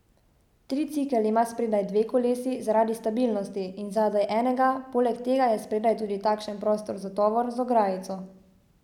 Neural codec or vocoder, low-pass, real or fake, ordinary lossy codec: none; 19.8 kHz; real; none